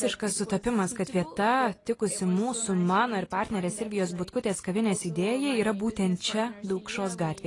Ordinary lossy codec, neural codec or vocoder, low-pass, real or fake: AAC, 32 kbps; none; 10.8 kHz; real